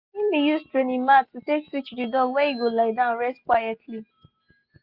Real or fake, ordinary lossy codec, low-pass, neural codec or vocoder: real; Opus, 64 kbps; 5.4 kHz; none